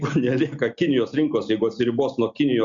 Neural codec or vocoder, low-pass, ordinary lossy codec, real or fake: none; 7.2 kHz; Opus, 64 kbps; real